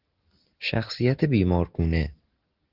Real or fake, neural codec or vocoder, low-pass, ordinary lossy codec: real; none; 5.4 kHz; Opus, 16 kbps